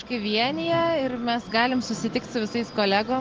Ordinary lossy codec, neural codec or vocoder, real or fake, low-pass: Opus, 16 kbps; none; real; 7.2 kHz